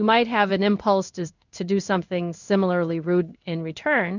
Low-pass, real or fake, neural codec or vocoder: 7.2 kHz; fake; codec, 16 kHz in and 24 kHz out, 1 kbps, XY-Tokenizer